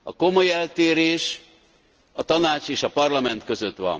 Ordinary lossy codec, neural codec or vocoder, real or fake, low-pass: Opus, 16 kbps; none; real; 7.2 kHz